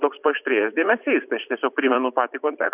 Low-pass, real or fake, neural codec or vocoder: 3.6 kHz; fake; vocoder, 44.1 kHz, 128 mel bands every 512 samples, BigVGAN v2